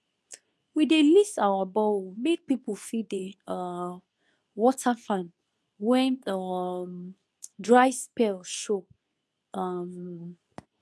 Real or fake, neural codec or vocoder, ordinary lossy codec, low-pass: fake; codec, 24 kHz, 0.9 kbps, WavTokenizer, medium speech release version 2; none; none